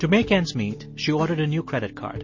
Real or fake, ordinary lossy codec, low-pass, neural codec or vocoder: real; MP3, 32 kbps; 7.2 kHz; none